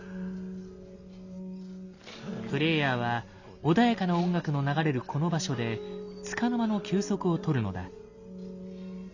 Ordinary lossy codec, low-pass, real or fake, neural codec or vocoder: none; 7.2 kHz; real; none